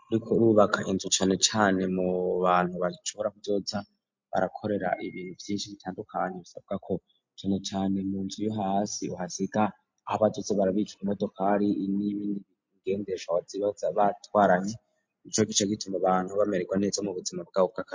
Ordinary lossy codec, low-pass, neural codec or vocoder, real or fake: MP3, 48 kbps; 7.2 kHz; none; real